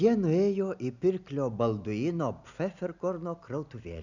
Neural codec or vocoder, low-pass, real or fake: none; 7.2 kHz; real